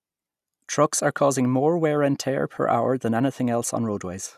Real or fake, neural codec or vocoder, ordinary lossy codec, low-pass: real; none; none; 14.4 kHz